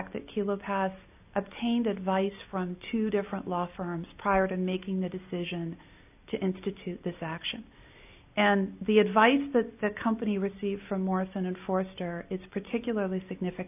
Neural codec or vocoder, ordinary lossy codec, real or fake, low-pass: none; AAC, 32 kbps; real; 3.6 kHz